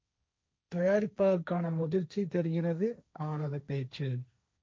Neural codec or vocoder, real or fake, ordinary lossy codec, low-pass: codec, 16 kHz, 1.1 kbps, Voila-Tokenizer; fake; none; none